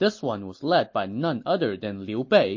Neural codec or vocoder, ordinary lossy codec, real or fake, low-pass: none; MP3, 32 kbps; real; 7.2 kHz